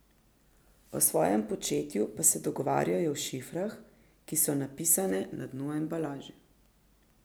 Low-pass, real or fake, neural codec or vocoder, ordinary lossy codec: none; real; none; none